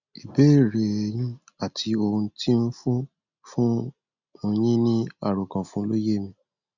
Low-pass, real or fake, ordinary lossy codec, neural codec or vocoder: 7.2 kHz; real; none; none